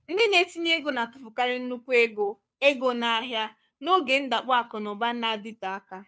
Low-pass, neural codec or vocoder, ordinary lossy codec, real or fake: none; codec, 16 kHz, 2 kbps, FunCodec, trained on Chinese and English, 25 frames a second; none; fake